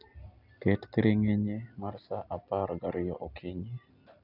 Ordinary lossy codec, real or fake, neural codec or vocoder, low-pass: none; real; none; 5.4 kHz